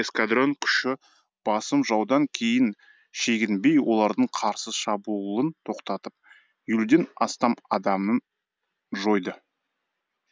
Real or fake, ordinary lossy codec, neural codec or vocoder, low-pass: real; none; none; none